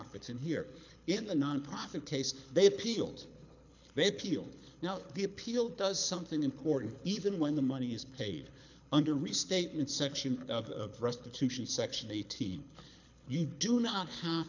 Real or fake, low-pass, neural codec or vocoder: fake; 7.2 kHz; codec, 24 kHz, 6 kbps, HILCodec